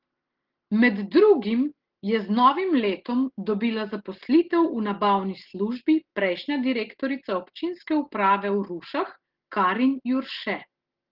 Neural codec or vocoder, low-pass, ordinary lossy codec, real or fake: none; 5.4 kHz; Opus, 16 kbps; real